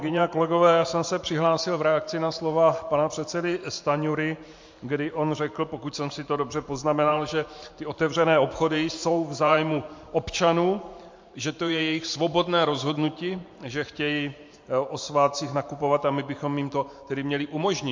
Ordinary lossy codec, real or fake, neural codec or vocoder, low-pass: MP3, 48 kbps; fake; vocoder, 44.1 kHz, 128 mel bands every 512 samples, BigVGAN v2; 7.2 kHz